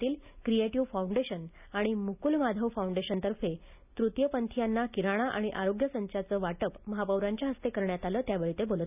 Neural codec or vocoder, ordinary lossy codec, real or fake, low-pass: none; none; real; 3.6 kHz